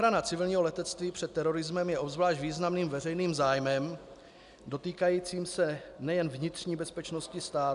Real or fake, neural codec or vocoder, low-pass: real; none; 10.8 kHz